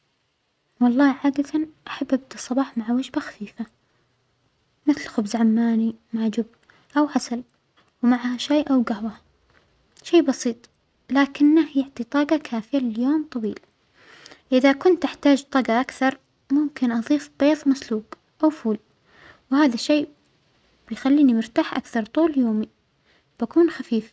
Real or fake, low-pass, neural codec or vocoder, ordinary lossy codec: real; none; none; none